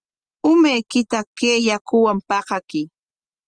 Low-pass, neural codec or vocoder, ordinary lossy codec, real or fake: 9.9 kHz; none; Opus, 24 kbps; real